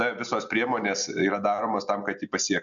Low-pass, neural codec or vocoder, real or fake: 7.2 kHz; none; real